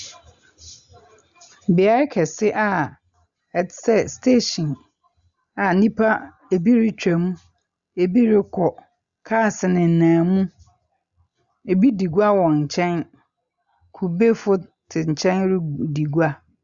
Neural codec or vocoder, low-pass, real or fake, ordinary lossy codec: none; 7.2 kHz; real; Opus, 64 kbps